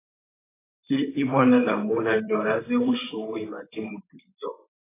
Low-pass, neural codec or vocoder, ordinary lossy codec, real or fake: 3.6 kHz; vocoder, 44.1 kHz, 128 mel bands, Pupu-Vocoder; AAC, 16 kbps; fake